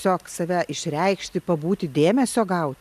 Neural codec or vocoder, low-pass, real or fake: none; 14.4 kHz; real